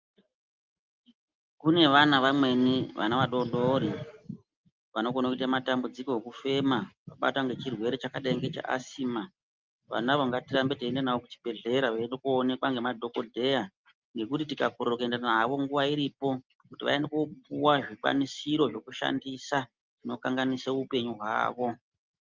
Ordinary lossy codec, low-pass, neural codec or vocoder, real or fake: Opus, 24 kbps; 7.2 kHz; none; real